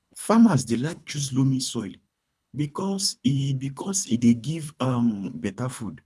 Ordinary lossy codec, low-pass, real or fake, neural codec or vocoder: none; none; fake; codec, 24 kHz, 3 kbps, HILCodec